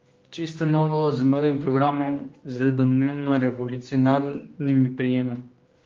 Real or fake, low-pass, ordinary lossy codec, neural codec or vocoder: fake; 7.2 kHz; Opus, 32 kbps; codec, 16 kHz, 1 kbps, X-Codec, HuBERT features, trained on general audio